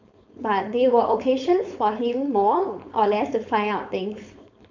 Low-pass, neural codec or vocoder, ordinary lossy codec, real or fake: 7.2 kHz; codec, 16 kHz, 4.8 kbps, FACodec; MP3, 64 kbps; fake